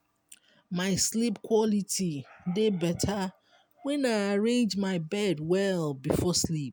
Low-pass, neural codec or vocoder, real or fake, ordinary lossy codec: none; none; real; none